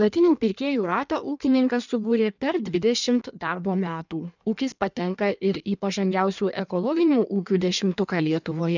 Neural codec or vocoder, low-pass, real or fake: codec, 16 kHz in and 24 kHz out, 1.1 kbps, FireRedTTS-2 codec; 7.2 kHz; fake